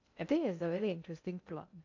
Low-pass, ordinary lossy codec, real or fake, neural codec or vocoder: 7.2 kHz; AAC, 48 kbps; fake; codec, 16 kHz in and 24 kHz out, 0.6 kbps, FocalCodec, streaming, 2048 codes